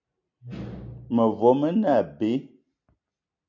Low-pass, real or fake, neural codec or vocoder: 7.2 kHz; real; none